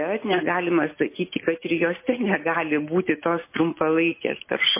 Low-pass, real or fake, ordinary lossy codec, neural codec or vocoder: 3.6 kHz; real; MP3, 24 kbps; none